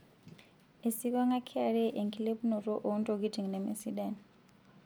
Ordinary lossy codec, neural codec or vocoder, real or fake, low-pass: none; none; real; none